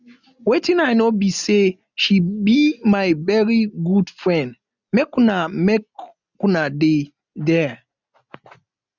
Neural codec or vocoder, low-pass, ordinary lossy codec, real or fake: none; 7.2 kHz; none; real